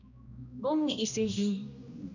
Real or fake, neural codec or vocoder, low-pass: fake; codec, 16 kHz, 1 kbps, X-Codec, HuBERT features, trained on general audio; 7.2 kHz